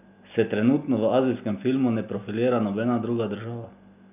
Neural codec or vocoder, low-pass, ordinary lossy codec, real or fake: none; 3.6 kHz; none; real